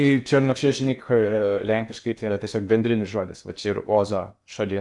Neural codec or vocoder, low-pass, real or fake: codec, 16 kHz in and 24 kHz out, 0.8 kbps, FocalCodec, streaming, 65536 codes; 10.8 kHz; fake